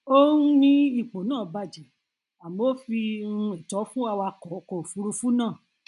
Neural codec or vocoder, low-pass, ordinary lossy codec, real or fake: none; 10.8 kHz; none; real